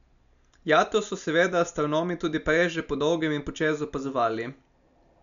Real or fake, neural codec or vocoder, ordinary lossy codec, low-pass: real; none; none; 7.2 kHz